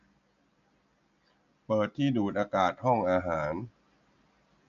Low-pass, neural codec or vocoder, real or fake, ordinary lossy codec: 7.2 kHz; none; real; none